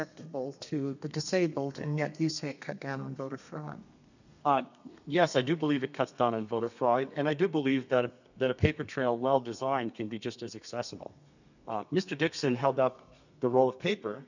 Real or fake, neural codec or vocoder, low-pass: fake; codec, 32 kHz, 1.9 kbps, SNAC; 7.2 kHz